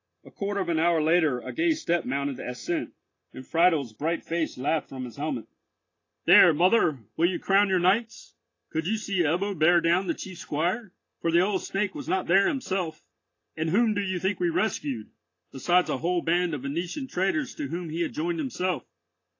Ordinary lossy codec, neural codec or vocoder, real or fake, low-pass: AAC, 32 kbps; none; real; 7.2 kHz